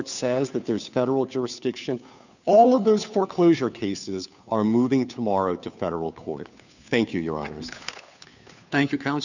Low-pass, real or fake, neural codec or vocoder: 7.2 kHz; fake; codec, 16 kHz, 2 kbps, FunCodec, trained on Chinese and English, 25 frames a second